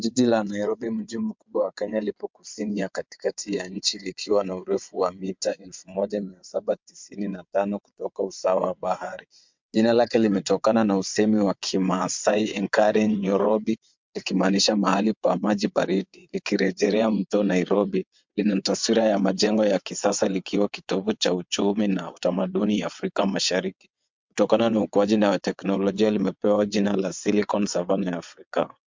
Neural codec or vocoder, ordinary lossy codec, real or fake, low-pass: vocoder, 22.05 kHz, 80 mel bands, WaveNeXt; MP3, 64 kbps; fake; 7.2 kHz